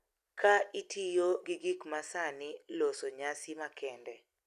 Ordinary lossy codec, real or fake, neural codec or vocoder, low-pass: none; real; none; 14.4 kHz